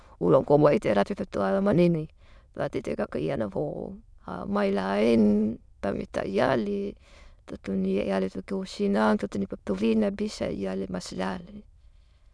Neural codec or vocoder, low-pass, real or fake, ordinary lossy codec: autoencoder, 22.05 kHz, a latent of 192 numbers a frame, VITS, trained on many speakers; none; fake; none